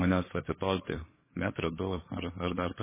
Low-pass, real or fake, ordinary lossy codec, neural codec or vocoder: 3.6 kHz; fake; MP3, 16 kbps; codec, 16 kHz, 4 kbps, FreqCodec, larger model